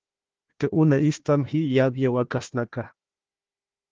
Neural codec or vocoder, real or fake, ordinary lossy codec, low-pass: codec, 16 kHz, 1 kbps, FunCodec, trained on Chinese and English, 50 frames a second; fake; Opus, 16 kbps; 7.2 kHz